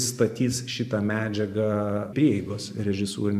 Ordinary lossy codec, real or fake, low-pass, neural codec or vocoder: MP3, 96 kbps; fake; 14.4 kHz; vocoder, 44.1 kHz, 128 mel bands every 512 samples, BigVGAN v2